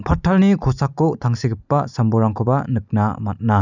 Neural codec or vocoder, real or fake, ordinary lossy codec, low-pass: none; real; none; 7.2 kHz